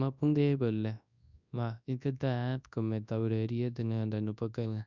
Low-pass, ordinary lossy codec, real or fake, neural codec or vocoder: 7.2 kHz; none; fake; codec, 24 kHz, 0.9 kbps, WavTokenizer, large speech release